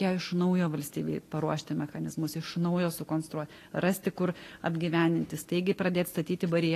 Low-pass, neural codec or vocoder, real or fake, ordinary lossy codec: 14.4 kHz; none; real; AAC, 48 kbps